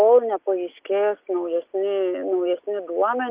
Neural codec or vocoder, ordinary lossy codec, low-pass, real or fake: none; Opus, 32 kbps; 3.6 kHz; real